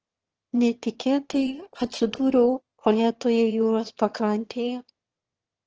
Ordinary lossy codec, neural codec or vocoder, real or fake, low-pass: Opus, 16 kbps; autoencoder, 22.05 kHz, a latent of 192 numbers a frame, VITS, trained on one speaker; fake; 7.2 kHz